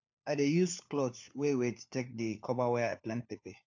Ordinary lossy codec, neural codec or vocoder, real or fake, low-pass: AAC, 48 kbps; codec, 16 kHz, 4 kbps, FunCodec, trained on LibriTTS, 50 frames a second; fake; 7.2 kHz